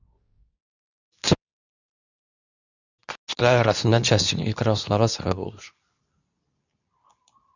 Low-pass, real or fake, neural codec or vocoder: 7.2 kHz; fake; codec, 24 kHz, 0.9 kbps, WavTokenizer, medium speech release version 2